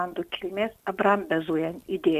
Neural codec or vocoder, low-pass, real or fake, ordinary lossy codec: none; 14.4 kHz; real; AAC, 96 kbps